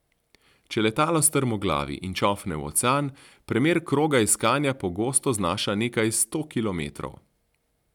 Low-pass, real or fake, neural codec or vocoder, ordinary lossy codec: 19.8 kHz; real; none; none